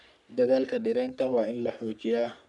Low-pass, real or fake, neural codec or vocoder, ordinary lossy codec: 10.8 kHz; fake; codec, 44.1 kHz, 3.4 kbps, Pupu-Codec; none